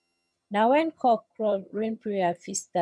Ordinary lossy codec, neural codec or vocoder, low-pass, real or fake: none; vocoder, 22.05 kHz, 80 mel bands, HiFi-GAN; none; fake